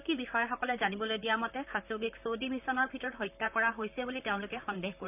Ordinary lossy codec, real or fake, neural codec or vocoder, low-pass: none; fake; vocoder, 44.1 kHz, 128 mel bands, Pupu-Vocoder; 3.6 kHz